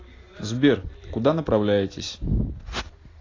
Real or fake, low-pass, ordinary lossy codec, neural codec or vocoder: real; 7.2 kHz; AAC, 32 kbps; none